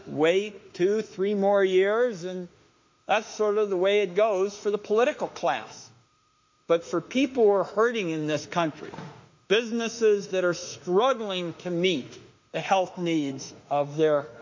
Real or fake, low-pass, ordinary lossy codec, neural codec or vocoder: fake; 7.2 kHz; MP3, 48 kbps; autoencoder, 48 kHz, 32 numbers a frame, DAC-VAE, trained on Japanese speech